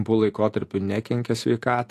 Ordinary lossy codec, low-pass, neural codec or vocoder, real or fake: AAC, 64 kbps; 14.4 kHz; none; real